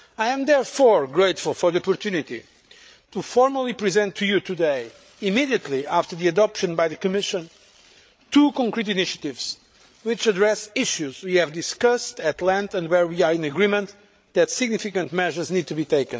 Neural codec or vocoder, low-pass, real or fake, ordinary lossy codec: codec, 16 kHz, 8 kbps, FreqCodec, larger model; none; fake; none